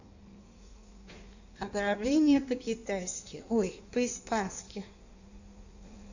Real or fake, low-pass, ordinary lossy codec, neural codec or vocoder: fake; 7.2 kHz; none; codec, 16 kHz in and 24 kHz out, 1.1 kbps, FireRedTTS-2 codec